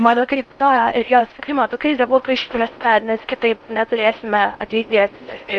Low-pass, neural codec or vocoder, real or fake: 10.8 kHz; codec, 16 kHz in and 24 kHz out, 0.8 kbps, FocalCodec, streaming, 65536 codes; fake